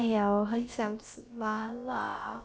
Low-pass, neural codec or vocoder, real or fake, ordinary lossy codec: none; codec, 16 kHz, about 1 kbps, DyCAST, with the encoder's durations; fake; none